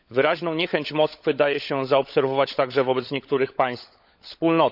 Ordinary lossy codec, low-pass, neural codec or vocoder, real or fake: none; 5.4 kHz; codec, 16 kHz, 16 kbps, FunCodec, trained on LibriTTS, 50 frames a second; fake